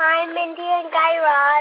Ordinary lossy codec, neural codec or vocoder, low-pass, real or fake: AAC, 24 kbps; none; 5.4 kHz; real